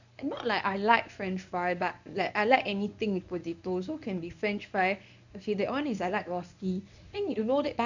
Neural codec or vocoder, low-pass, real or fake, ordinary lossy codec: codec, 24 kHz, 0.9 kbps, WavTokenizer, medium speech release version 1; 7.2 kHz; fake; none